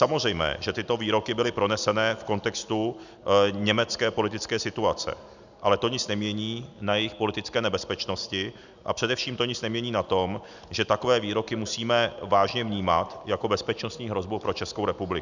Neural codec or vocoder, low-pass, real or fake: none; 7.2 kHz; real